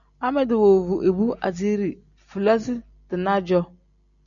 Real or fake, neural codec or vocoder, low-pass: real; none; 7.2 kHz